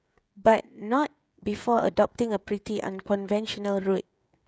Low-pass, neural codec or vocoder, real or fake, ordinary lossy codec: none; codec, 16 kHz, 16 kbps, FreqCodec, smaller model; fake; none